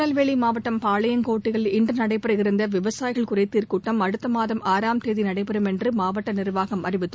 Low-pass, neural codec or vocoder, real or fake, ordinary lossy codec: none; none; real; none